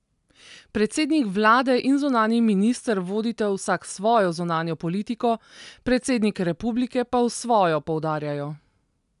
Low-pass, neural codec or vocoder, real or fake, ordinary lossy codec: 10.8 kHz; none; real; none